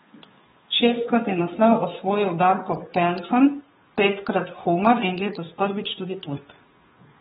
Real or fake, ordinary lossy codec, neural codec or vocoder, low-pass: fake; AAC, 16 kbps; codec, 16 kHz, 2 kbps, FunCodec, trained on Chinese and English, 25 frames a second; 7.2 kHz